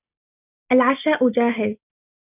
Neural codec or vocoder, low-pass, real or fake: none; 3.6 kHz; real